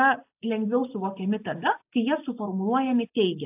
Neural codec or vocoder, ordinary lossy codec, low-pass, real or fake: none; AAC, 32 kbps; 3.6 kHz; real